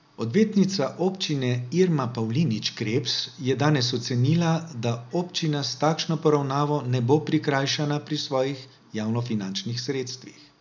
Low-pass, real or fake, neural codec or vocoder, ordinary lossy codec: 7.2 kHz; real; none; none